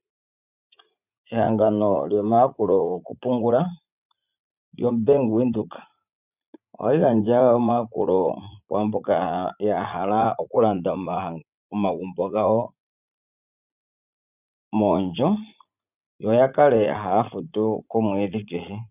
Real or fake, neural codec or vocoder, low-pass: fake; vocoder, 44.1 kHz, 80 mel bands, Vocos; 3.6 kHz